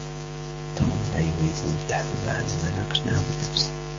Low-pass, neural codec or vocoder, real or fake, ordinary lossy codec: 7.2 kHz; codec, 16 kHz, 6 kbps, DAC; fake; MP3, 32 kbps